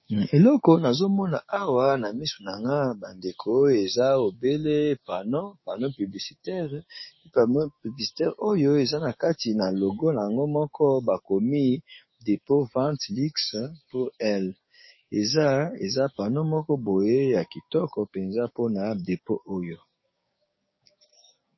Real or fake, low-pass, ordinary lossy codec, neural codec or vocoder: fake; 7.2 kHz; MP3, 24 kbps; codec, 16 kHz, 6 kbps, DAC